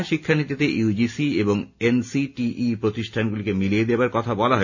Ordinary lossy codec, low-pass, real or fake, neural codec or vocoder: none; 7.2 kHz; real; none